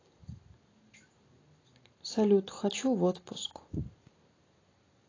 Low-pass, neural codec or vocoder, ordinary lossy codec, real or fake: 7.2 kHz; none; AAC, 32 kbps; real